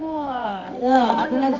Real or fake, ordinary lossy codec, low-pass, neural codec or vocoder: fake; none; 7.2 kHz; codec, 24 kHz, 0.9 kbps, WavTokenizer, medium music audio release